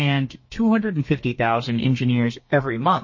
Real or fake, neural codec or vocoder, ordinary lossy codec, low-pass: fake; codec, 32 kHz, 1.9 kbps, SNAC; MP3, 32 kbps; 7.2 kHz